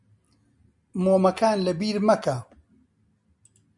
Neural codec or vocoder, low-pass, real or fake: none; 10.8 kHz; real